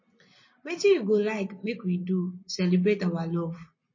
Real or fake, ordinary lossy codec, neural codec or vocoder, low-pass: real; MP3, 32 kbps; none; 7.2 kHz